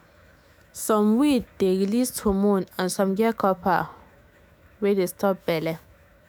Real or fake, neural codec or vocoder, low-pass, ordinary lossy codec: fake; autoencoder, 48 kHz, 128 numbers a frame, DAC-VAE, trained on Japanese speech; none; none